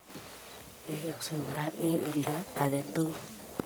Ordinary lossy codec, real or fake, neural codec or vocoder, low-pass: none; fake; codec, 44.1 kHz, 1.7 kbps, Pupu-Codec; none